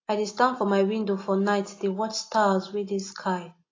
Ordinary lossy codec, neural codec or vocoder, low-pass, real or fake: AAC, 32 kbps; none; 7.2 kHz; real